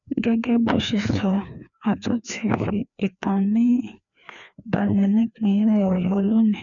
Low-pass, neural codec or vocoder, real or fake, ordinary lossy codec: 7.2 kHz; codec, 16 kHz, 2 kbps, FreqCodec, larger model; fake; none